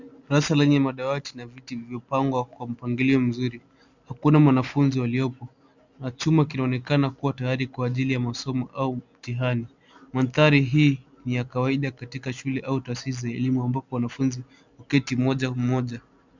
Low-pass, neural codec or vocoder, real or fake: 7.2 kHz; none; real